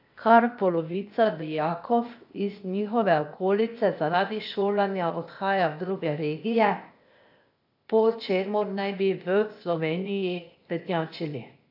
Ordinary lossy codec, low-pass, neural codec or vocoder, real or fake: AAC, 48 kbps; 5.4 kHz; codec, 16 kHz, 0.8 kbps, ZipCodec; fake